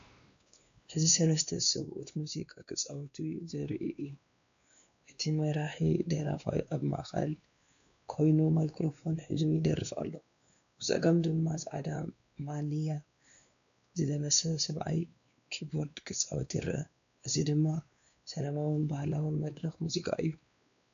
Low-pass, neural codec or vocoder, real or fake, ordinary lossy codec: 7.2 kHz; codec, 16 kHz, 2 kbps, X-Codec, WavLM features, trained on Multilingual LibriSpeech; fake; AAC, 96 kbps